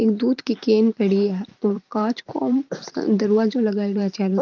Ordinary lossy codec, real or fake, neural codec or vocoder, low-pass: Opus, 24 kbps; fake; vocoder, 44.1 kHz, 128 mel bands every 512 samples, BigVGAN v2; 7.2 kHz